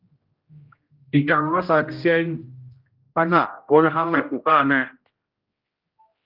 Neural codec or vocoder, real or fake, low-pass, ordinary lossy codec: codec, 16 kHz, 0.5 kbps, X-Codec, HuBERT features, trained on general audio; fake; 5.4 kHz; Opus, 32 kbps